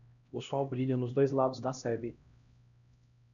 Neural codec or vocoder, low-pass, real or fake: codec, 16 kHz, 1 kbps, X-Codec, HuBERT features, trained on LibriSpeech; 7.2 kHz; fake